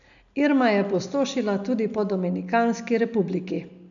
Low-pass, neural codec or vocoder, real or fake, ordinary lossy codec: 7.2 kHz; none; real; none